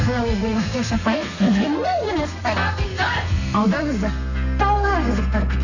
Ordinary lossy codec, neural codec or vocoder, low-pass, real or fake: none; codec, 32 kHz, 1.9 kbps, SNAC; 7.2 kHz; fake